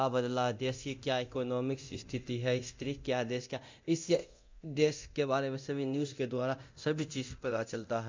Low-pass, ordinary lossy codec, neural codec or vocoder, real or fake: 7.2 kHz; MP3, 64 kbps; codec, 24 kHz, 0.9 kbps, DualCodec; fake